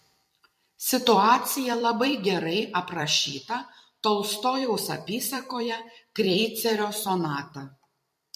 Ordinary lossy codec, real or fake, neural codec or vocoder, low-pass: MP3, 64 kbps; fake; vocoder, 44.1 kHz, 128 mel bands every 256 samples, BigVGAN v2; 14.4 kHz